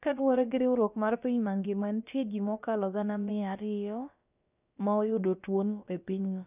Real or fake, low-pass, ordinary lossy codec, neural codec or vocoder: fake; 3.6 kHz; none; codec, 16 kHz, about 1 kbps, DyCAST, with the encoder's durations